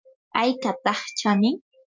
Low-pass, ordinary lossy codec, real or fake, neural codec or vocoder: 7.2 kHz; MP3, 64 kbps; real; none